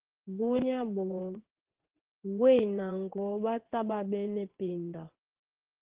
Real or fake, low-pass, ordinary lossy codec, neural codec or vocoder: fake; 3.6 kHz; Opus, 16 kbps; vocoder, 22.05 kHz, 80 mel bands, WaveNeXt